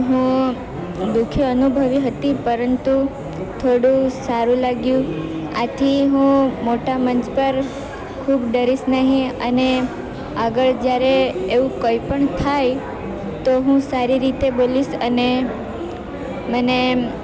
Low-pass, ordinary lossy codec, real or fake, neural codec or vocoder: none; none; real; none